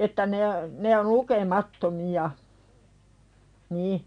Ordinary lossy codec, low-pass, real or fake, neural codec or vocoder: none; 9.9 kHz; real; none